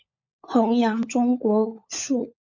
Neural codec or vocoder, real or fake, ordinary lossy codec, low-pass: codec, 16 kHz, 16 kbps, FunCodec, trained on LibriTTS, 50 frames a second; fake; MP3, 48 kbps; 7.2 kHz